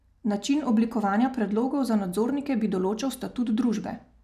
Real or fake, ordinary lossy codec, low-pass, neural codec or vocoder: real; none; 14.4 kHz; none